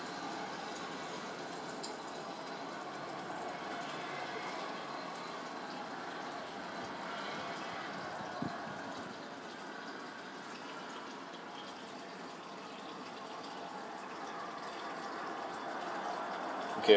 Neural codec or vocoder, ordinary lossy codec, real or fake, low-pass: none; none; real; none